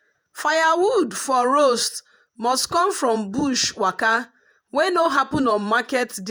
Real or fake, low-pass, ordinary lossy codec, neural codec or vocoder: fake; none; none; vocoder, 48 kHz, 128 mel bands, Vocos